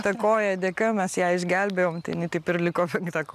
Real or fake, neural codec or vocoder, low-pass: real; none; 14.4 kHz